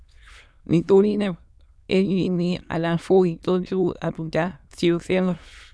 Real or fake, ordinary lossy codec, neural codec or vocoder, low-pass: fake; none; autoencoder, 22.05 kHz, a latent of 192 numbers a frame, VITS, trained on many speakers; none